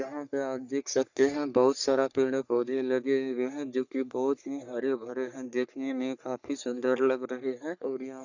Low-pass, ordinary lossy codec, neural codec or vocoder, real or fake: 7.2 kHz; none; codec, 44.1 kHz, 3.4 kbps, Pupu-Codec; fake